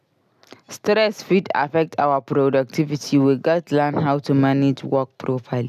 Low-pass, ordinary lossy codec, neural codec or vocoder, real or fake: 14.4 kHz; none; none; real